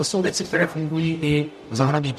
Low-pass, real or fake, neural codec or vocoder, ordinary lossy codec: 14.4 kHz; fake; codec, 44.1 kHz, 0.9 kbps, DAC; MP3, 64 kbps